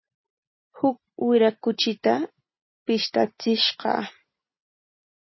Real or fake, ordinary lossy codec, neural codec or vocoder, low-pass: real; MP3, 24 kbps; none; 7.2 kHz